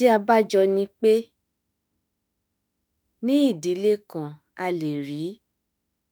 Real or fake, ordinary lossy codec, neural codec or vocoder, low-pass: fake; none; autoencoder, 48 kHz, 32 numbers a frame, DAC-VAE, trained on Japanese speech; none